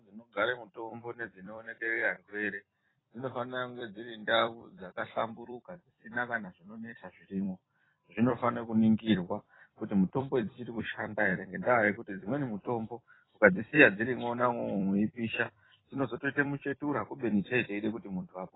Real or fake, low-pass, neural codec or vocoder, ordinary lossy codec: fake; 7.2 kHz; vocoder, 44.1 kHz, 128 mel bands every 256 samples, BigVGAN v2; AAC, 16 kbps